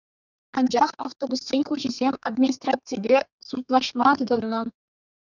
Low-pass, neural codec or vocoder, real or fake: 7.2 kHz; codec, 32 kHz, 1.9 kbps, SNAC; fake